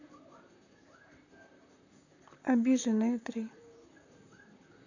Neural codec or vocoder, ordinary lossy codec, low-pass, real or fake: vocoder, 44.1 kHz, 128 mel bands, Pupu-Vocoder; none; 7.2 kHz; fake